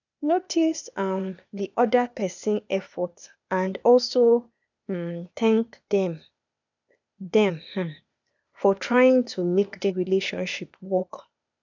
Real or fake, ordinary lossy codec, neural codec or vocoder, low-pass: fake; none; codec, 16 kHz, 0.8 kbps, ZipCodec; 7.2 kHz